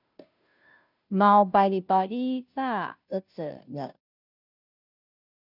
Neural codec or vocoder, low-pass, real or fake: codec, 16 kHz, 0.5 kbps, FunCodec, trained on Chinese and English, 25 frames a second; 5.4 kHz; fake